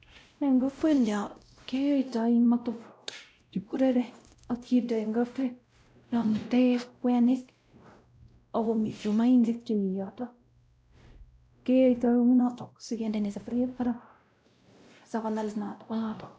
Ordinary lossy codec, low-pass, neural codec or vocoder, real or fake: none; none; codec, 16 kHz, 0.5 kbps, X-Codec, WavLM features, trained on Multilingual LibriSpeech; fake